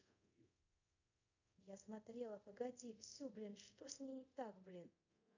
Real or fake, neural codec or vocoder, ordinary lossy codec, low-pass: fake; codec, 24 kHz, 0.5 kbps, DualCodec; none; 7.2 kHz